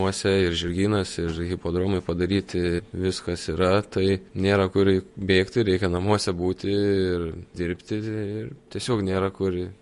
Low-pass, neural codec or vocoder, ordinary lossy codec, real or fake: 14.4 kHz; vocoder, 48 kHz, 128 mel bands, Vocos; MP3, 48 kbps; fake